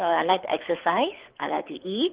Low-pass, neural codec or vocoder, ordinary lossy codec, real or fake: 3.6 kHz; codec, 24 kHz, 6 kbps, HILCodec; Opus, 16 kbps; fake